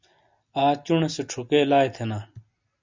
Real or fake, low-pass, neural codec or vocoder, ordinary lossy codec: real; 7.2 kHz; none; MP3, 64 kbps